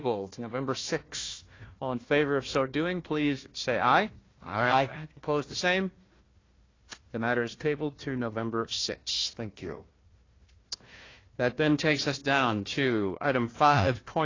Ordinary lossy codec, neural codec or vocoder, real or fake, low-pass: AAC, 32 kbps; codec, 16 kHz, 1 kbps, FunCodec, trained on Chinese and English, 50 frames a second; fake; 7.2 kHz